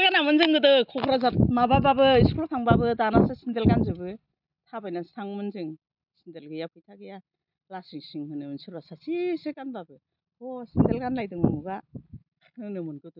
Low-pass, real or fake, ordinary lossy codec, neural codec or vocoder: 5.4 kHz; real; none; none